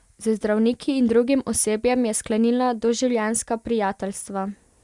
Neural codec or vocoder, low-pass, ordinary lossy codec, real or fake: none; 10.8 kHz; Opus, 64 kbps; real